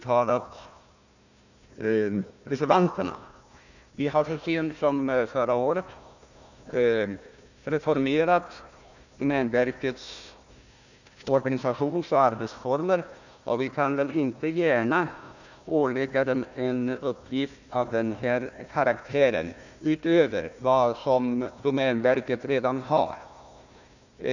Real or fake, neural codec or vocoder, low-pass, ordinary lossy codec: fake; codec, 16 kHz, 1 kbps, FunCodec, trained on Chinese and English, 50 frames a second; 7.2 kHz; none